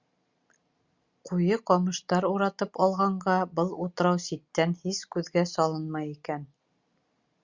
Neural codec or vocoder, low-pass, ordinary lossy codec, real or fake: none; 7.2 kHz; Opus, 64 kbps; real